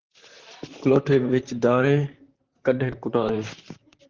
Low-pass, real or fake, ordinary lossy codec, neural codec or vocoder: 7.2 kHz; fake; Opus, 16 kbps; vocoder, 44.1 kHz, 128 mel bands, Pupu-Vocoder